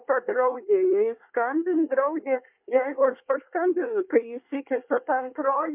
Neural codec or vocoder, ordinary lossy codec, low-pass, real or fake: codec, 24 kHz, 1 kbps, SNAC; MP3, 32 kbps; 3.6 kHz; fake